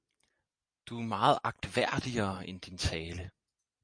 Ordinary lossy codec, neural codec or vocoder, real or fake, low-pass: MP3, 64 kbps; none; real; 9.9 kHz